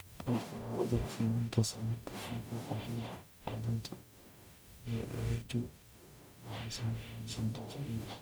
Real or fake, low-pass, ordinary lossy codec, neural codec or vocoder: fake; none; none; codec, 44.1 kHz, 0.9 kbps, DAC